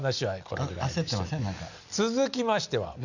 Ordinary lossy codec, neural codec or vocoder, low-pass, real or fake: none; none; 7.2 kHz; real